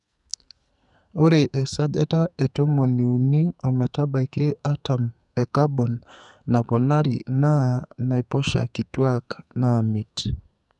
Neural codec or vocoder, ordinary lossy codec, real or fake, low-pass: codec, 44.1 kHz, 2.6 kbps, SNAC; none; fake; 10.8 kHz